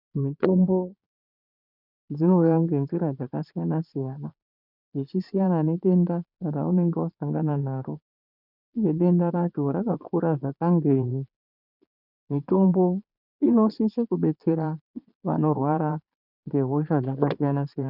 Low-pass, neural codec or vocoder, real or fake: 5.4 kHz; vocoder, 22.05 kHz, 80 mel bands, Vocos; fake